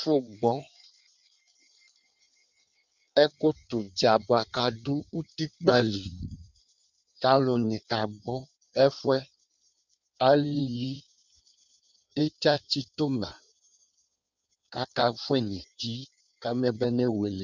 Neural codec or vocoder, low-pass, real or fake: codec, 16 kHz in and 24 kHz out, 1.1 kbps, FireRedTTS-2 codec; 7.2 kHz; fake